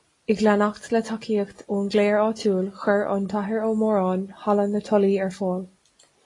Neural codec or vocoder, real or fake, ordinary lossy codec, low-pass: none; real; AAC, 32 kbps; 10.8 kHz